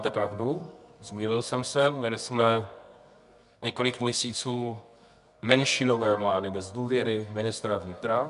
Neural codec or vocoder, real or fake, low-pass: codec, 24 kHz, 0.9 kbps, WavTokenizer, medium music audio release; fake; 10.8 kHz